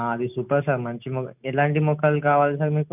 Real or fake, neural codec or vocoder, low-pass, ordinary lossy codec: real; none; 3.6 kHz; none